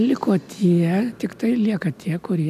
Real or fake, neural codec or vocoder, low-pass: real; none; 14.4 kHz